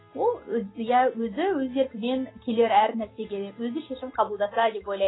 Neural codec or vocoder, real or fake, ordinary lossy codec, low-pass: none; real; AAC, 16 kbps; 7.2 kHz